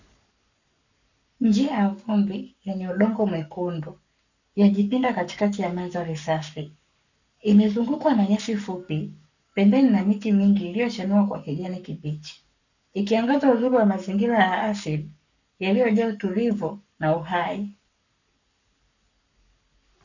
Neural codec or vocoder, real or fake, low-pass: codec, 44.1 kHz, 7.8 kbps, Pupu-Codec; fake; 7.2 kHz